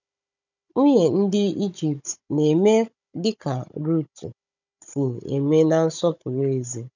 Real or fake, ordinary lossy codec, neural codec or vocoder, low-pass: fake; none; codec, 16 kHz, 16 kbps, FunCodec, trained on Chinese and English, 50 frames a second; 7.2 kHz